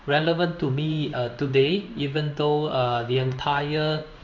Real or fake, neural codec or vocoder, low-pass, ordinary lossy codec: fake; codec, 16 kHz in and 24 kHz out, 1 kbps, XY-Tokenizer; 7.2 kHz; none